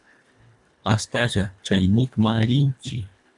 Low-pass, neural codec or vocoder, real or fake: 10.8 kHz; codec, 24 kHz, 1.5 kbps, HILCodec; fake